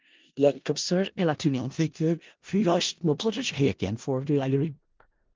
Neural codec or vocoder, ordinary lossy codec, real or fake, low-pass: codec, 16 kHz in and 24 kHz out, 0.4 kbps, LongCat-Audio-Codec, four codebook decoder; Opus, 32 kbps; fake; 7.2 kHz